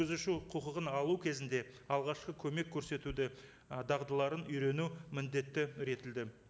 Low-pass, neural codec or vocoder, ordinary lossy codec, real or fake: none; none; none; real